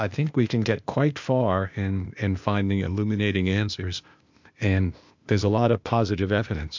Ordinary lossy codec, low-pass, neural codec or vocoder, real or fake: MP3, 64 kbps; 7.2 kHz; codec, 16 kHz, 0.8 kbps, ZipCodec; fake